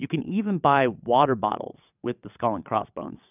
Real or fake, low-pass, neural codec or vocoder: fake; 3.6 kHz; vocoder, 22.05 kHz, 80 mel bands, WaveNeXt